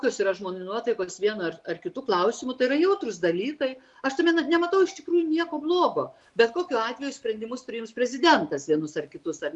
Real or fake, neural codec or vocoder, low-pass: real; none; 10.8 kHz